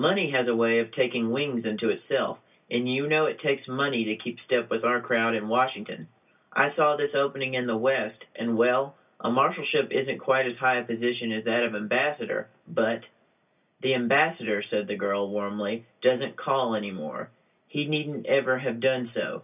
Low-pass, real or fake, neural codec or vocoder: 3.6 kHz; real; none